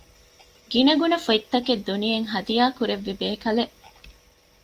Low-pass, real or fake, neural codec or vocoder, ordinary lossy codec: 14.4 kHz; real; none; Opus, 32 kbps